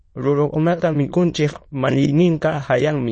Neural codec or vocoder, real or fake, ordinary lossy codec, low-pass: autoencoder, 22.05 kHz, a latent of 192 numbers a frame, VITS, trained on many speakers; fake; MP3, 32 kbps; 9.9 kHz